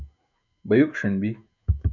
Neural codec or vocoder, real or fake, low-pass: autoencoder, 48 kHz, 128 numbers a frame, DAC-VAE, trained on Japanese speech; fake; 7.2 kHz